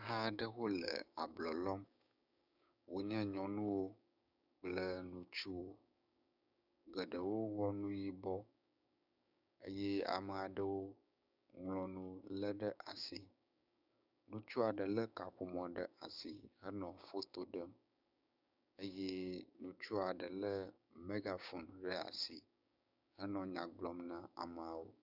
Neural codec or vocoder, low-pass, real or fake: codec, 16 kHz, 6 kbps, DAC; 5.4 kHz; fake